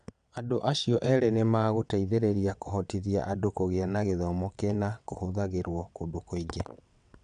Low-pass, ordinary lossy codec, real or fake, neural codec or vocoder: 9.9 kHz; none; fake; vocoder, 22.05 kHz, 80 mel bands, WaveNeXt